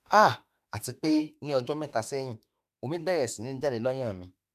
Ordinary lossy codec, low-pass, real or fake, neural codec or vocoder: none; 14.4 kHz; fake; autoencoder, 48 kHz, 32 numbers a frame, DAC-VAE, trained on Japanese speech